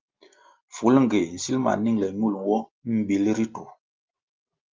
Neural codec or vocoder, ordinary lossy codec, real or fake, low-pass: none; Opus, 32 kbps; real; 7.2 kHz